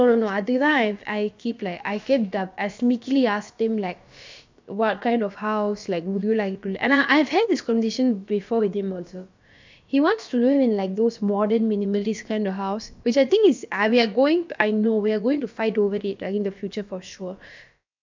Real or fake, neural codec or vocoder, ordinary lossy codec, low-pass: fake; codec, 16 kHz, about 1 kbps, DyCAST, with the encoder's durations; MP3, 64 kbps; 7.2 kHz